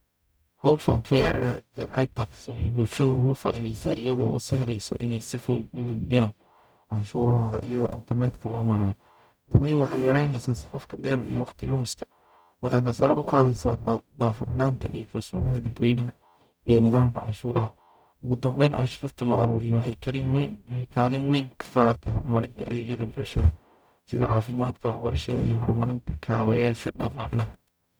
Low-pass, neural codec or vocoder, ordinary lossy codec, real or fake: none; codec, 44.1 kHz, 0.9 kbps, DAC; none; fake